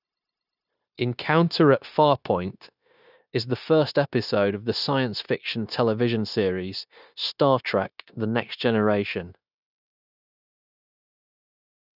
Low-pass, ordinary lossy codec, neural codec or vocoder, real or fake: 5.4 kHz; none; codec, 16 kHz, 0.9 kbps, LongCat-Audio-Codec; fake